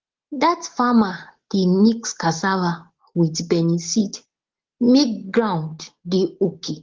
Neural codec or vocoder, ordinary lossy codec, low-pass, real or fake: none; Opus, 16 kbps; 7.2 kHz; real